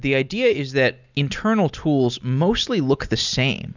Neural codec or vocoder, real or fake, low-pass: none; real; 7.2 kHz